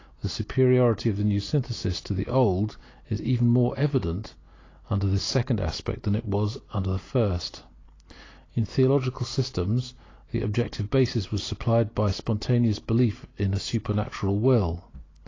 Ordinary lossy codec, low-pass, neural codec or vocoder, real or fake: AAC, 32 kbps; 7.2 kHz; none; real